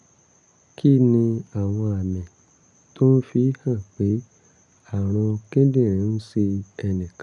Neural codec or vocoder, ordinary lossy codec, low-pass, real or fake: none; none; 10.8 kHz; real